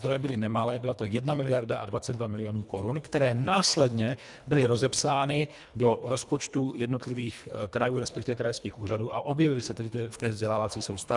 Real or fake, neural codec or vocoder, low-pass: fake; codec, 24 kHz, 1.5 kbps, HILCodec; 10.8 kHz